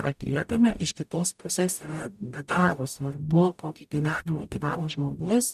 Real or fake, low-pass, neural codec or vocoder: fake; 14.4 kHz; codec, 44.1 kHz, 0.9 kbps, DAC